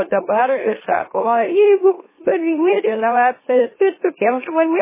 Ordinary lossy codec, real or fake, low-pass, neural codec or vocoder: MP3, 16 kbps; fake; 3.6 kHz; autoencoder, 44.1 kHz, a latent of 192 numbers a frame, MeloTTS